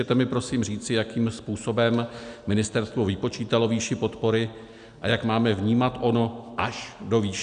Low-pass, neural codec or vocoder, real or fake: 9.9 kHz; none; real